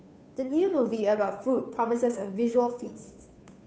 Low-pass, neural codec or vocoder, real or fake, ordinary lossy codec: none; codec, 16 kHz, 2 kbps, FunCodec, trained on Chinese and English, 25 frames a second; fake; none